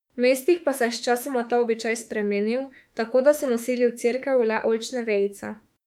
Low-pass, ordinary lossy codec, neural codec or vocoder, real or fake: 19.8 kHz; MP3, 96 kbps; autoencoder, 48 kHz, 32 numbers a frame, DAC-VAE, trained on Japanese speech; fake